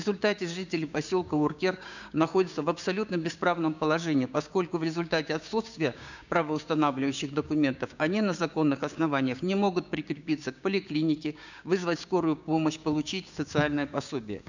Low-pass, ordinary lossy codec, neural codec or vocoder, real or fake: 7.2 kHz; none; codec, 16 kHz, 6 kbps, DAC; fake